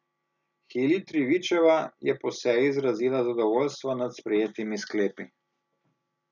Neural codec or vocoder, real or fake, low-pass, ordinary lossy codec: none; real; 7.2 kHz; none